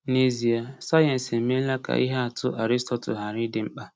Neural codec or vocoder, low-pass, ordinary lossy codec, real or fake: none; none; none; real